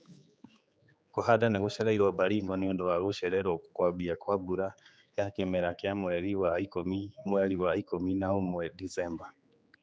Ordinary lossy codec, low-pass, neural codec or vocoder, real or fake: none; none; codec, 16 kHz, 4 kbps, X-Codec, HuBERT features, trained on general audio; fake